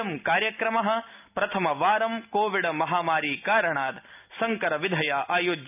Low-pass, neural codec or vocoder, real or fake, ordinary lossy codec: 3.6 kHz; none; real; none